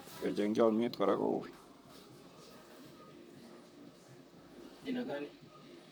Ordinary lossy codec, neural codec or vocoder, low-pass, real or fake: none; vocoder, 44.1 kHz, 128 mel bands, Pupu-Vocoder; none; fake